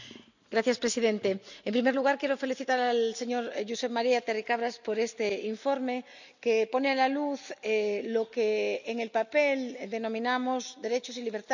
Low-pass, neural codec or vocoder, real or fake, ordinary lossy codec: 7.2 kHz; none; real; none